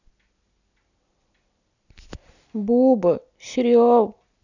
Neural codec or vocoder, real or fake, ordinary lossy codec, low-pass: none; real; none; 7.2 kHz